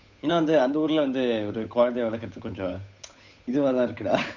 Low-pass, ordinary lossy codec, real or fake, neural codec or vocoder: 7.2 kHz; none; fake; codec, 16 kHz, 8 kbps, FunCodec, trained on Chinese and English, 25 frames a second